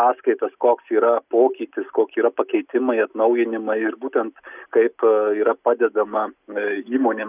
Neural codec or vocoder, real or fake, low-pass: none; real; 3.6 kHz